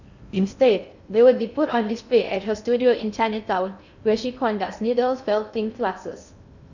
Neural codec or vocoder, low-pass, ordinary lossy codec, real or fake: codec, 16 kHz in and 24 kHz out, 0.6 kbps, FocalCodec, streaming, 4096 codes; 7.2 kHz; none; fake